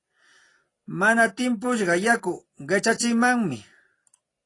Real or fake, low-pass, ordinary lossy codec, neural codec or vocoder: real; 10.8 kHz; AAC, 32 kbps; none